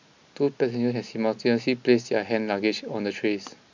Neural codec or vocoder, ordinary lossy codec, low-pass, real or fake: none; MP3, 64 kbps; 7.2 kHz; real